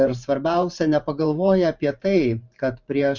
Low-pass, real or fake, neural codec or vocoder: 7.2 kHz; fake; vocoder, 44.1 kHz, 128 mel bands every 512 samples, BigVGAN v2